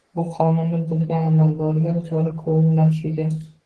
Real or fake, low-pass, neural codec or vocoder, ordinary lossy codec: fake; 10.8 kHz; codec, 44.1 kHz, 3.4 kbps, Pupu-Codec; Opus, 16 kbps